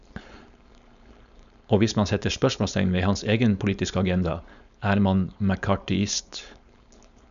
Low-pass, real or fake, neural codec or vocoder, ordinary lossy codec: 7.2 kHz; fake; codec, 16 kHz, 4.8 kbps, FACodec; none